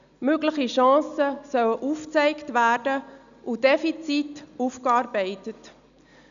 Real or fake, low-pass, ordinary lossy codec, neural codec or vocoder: real; 7.2 kHz; none; none